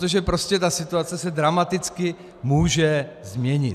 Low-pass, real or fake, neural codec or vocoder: 14.4 kHz; real; none